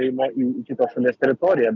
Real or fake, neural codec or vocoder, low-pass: real; none; 7.2 kHz